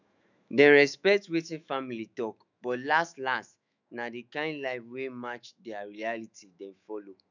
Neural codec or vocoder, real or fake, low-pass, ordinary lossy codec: autoencoder, 48 kHz, 128 numbers a frame, DAC-VAE, trained on Japanese speech; fake; 7.2 kHz; none